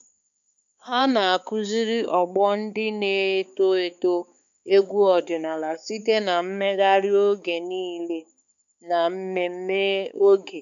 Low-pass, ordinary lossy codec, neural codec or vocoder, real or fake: 7.2 kHz; none; codec, 16 kHz, 4 kbps, X-Codec, HuBERT features, trained on balanced general audio; fake